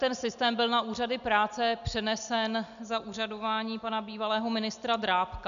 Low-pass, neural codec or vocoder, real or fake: 7.2 kHz; none; real